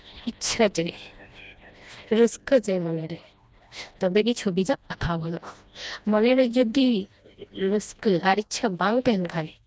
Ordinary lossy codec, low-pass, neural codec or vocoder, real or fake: none; none; codec, 16 kHz, 1 kbps, FreqCodec, smaller model; fake